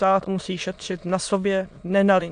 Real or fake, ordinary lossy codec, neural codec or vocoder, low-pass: fake; AAC, 64 kbps; autoencoder, 22.05 kHz, a latent of 192 numbers a frame, VITS, trained on many speakers; 9.9 kHz